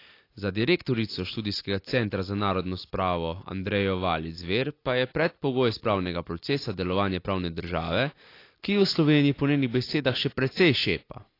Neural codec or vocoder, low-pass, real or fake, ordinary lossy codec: none; 5.4 kHz; real; AAC, 32 kbps